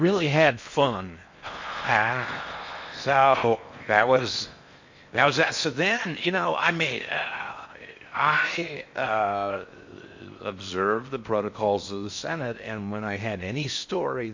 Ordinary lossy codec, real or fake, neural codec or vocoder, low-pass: MP3, 48 kbps; fake; codec, 16 kHz in and 24 kHz out, 0.6 kbps, FocalCodec, streaming, 4096 codes; 7.2 kHz